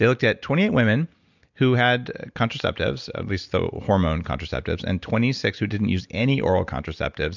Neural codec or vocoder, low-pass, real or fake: none; 7.2 kHz; real